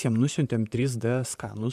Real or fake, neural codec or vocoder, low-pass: fake; vocoder, 44.1 kHz, 128 mel bands, Pupu-Vocoder; 14.4 kHz